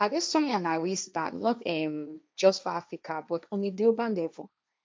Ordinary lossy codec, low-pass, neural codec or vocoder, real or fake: none; none; codec, 16 kHz, 1.1 kbps, Voila-Tokenizer; fake